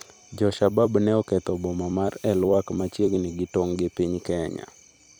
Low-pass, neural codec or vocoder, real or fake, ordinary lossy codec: none; vocoder, 44.1 kHz, 128 mel bands every 256 samples, BigVGAN v2; fake; none